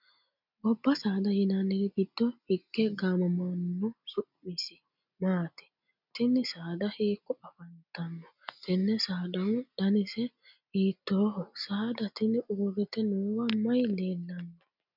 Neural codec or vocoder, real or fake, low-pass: none; real; 5.4 kHz